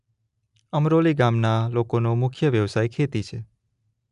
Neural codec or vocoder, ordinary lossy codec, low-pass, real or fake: none; none; 10.8 kHz; real